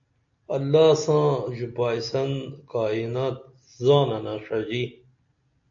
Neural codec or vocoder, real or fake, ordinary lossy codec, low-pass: none; real; AAC, 64 kbps; 7.2 kHz